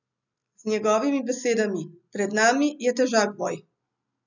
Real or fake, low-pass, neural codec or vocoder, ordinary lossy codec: real; 7.2 kHz; none; none